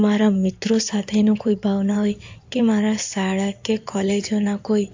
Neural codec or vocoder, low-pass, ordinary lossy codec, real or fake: codec, 16 kHz in and 24 kHz out, 2.2 kbps, FireRedTTS-2 codec; 7.2 kHz; AAC, 48 kbps; fake